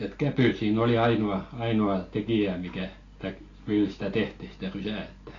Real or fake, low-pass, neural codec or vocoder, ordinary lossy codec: real; 7.2 kHz; none; AAC, 32 kbps